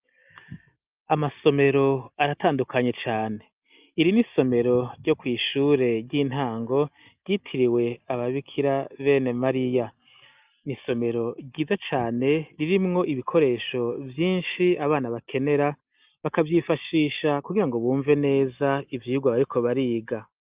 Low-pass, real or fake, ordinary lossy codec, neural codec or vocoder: 3.6 kHz; real; Opus, 24 kbps; none